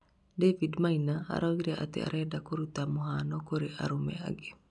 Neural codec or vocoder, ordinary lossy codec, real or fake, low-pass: none; none; real; 10.8 kHz